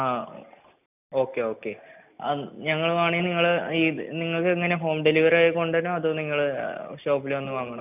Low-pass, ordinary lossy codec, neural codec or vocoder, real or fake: 3.6 kHz; none; none; real